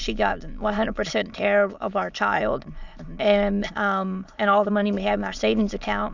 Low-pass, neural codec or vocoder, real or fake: 7.2 kHz; autoencoder, 22.05 kHz, a latent of 192 numbers a frame, VITS, trained on many speakers; fake